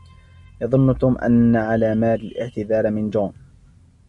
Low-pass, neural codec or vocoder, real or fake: 10.8 kHz; none; real